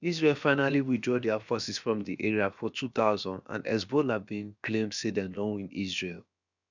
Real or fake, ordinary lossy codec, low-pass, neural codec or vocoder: fake; none; 7.2 kHz; codec, 16 kHz, about 1 kbps, DyCAST, with the encoder's durations